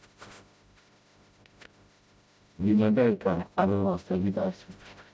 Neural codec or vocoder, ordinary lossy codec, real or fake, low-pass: codec, 16 kHz, 0.5 kbps, FreqCodec, smaller model; none; fake; none